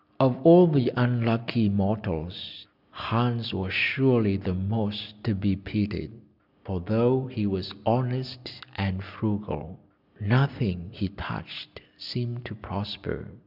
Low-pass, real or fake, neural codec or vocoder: 5.4 kHz; real; none